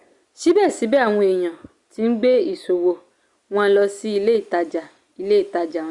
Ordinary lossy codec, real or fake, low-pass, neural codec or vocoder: Opus, 64 kbps; real; 10.8 kHz; none